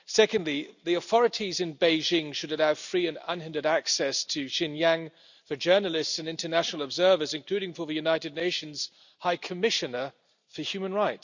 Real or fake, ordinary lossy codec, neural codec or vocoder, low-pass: real; none; none; 7.2 kHz